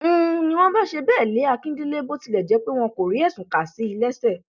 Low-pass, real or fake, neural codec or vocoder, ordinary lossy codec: 7.2 kHz; real; none; none